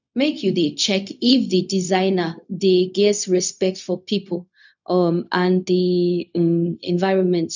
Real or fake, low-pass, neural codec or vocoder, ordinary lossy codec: fake; 7.2 kHz; codec, 16 kHz, 0.4 kbps, LongCat-Audio-Codec; none